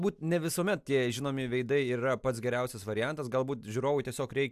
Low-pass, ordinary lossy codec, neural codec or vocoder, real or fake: 14.4 kHz; AAC, 96 kbps; none; real